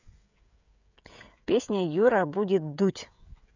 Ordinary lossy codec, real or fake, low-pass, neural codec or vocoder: none; fake; 7.2 kHz; codec, 16 kHz, 16 kbps, FreqCodec, smaller model